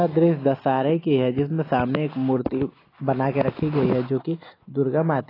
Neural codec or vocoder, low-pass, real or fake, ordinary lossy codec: none; 5.4 kHz; real; AAC, 24 kbps